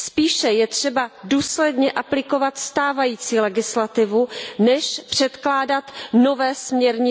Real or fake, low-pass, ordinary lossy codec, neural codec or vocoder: real; none; none; none